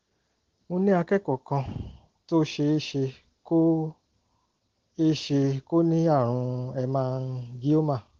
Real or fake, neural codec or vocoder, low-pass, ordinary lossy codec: real; none; 7.2 kHz; Opus, 16 kbps